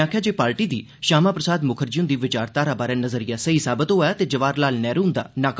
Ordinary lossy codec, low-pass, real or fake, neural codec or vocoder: none; none; real; none